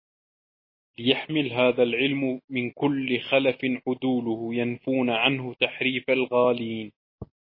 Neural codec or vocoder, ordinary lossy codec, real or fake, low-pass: none; MP3, 24 kbps; real; 5.4 kHz